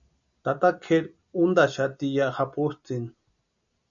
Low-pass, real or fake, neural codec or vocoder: 7.2 kHz; real; none